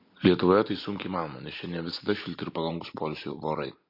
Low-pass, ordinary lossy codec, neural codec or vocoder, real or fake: 5.4 kHz; MP3, 32 kbps; none; real